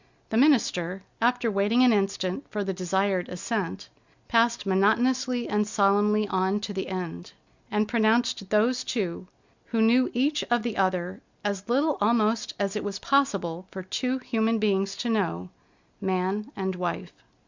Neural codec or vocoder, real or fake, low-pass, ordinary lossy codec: none; real; 7.2 kHz; Opus, 64 kbps